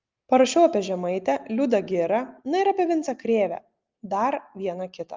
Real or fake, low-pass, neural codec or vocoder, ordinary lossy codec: real; 7.2 kHz; none; Opus, 24 kbps